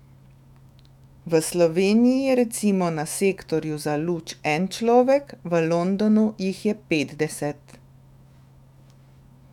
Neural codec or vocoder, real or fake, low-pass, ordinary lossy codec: autoencoder, 48 kHz, 128 numbers a frame, DAC-VAE, trained on Japanese speech; fake; 19.8 kHz; none